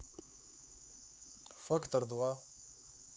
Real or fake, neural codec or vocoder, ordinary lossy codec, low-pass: fake; codec, 16 kHz, 4 kbps, X-Codec, HuBERT features, trained on LibriSpeech; none; none